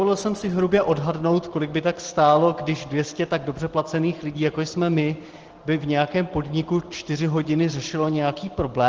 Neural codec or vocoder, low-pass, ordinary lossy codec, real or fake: none; 7.2 kHz; Opus, 16 kbps; real